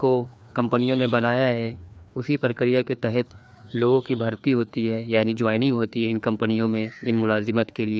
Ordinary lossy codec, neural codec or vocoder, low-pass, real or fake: none; codec, 16 kHz, 2 kbps, FreqCodec, larger model; none; fake